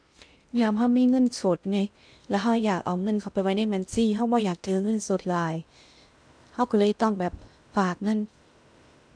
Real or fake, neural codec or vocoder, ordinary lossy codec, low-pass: fake; codec, 16 kHz in and 24 kHz out, 0.6 kbps, FocalCodec, streaming, 2048 codes; none; 9.9 kHz